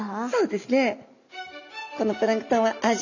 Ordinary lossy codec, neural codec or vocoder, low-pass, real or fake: none; none; 7.2 kHz; real